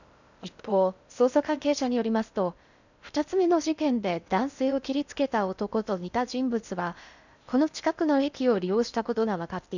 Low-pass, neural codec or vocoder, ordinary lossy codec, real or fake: 7.2 kHz; codec, 16 kHz in and 24 kHz out, 0.6 kbps, FocalCodec, streaming, 2048 codes; none; fake